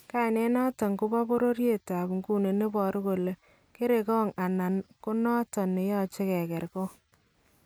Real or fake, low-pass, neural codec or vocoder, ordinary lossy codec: real; none; none; none